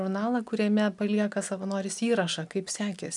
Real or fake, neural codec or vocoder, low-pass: real; none; 10.8 kHz